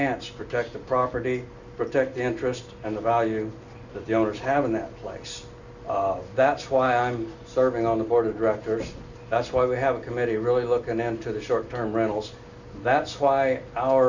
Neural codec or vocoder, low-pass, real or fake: none; 7.2 kHz; real